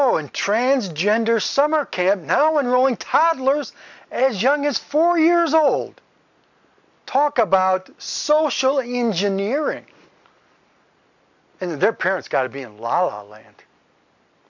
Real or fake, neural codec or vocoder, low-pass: real; none; 7.2 kHz